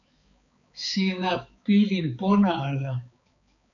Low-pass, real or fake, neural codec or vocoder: 7.2 kHz; fake; codec, 16 kHz, 4 kbps, X-Codec, HuBERT features, trained on balanced general audio